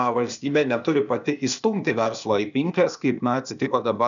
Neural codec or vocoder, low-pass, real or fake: codec, 16 kHz, 0.8 kbps, ZipCodec; 7.2 kHz; fake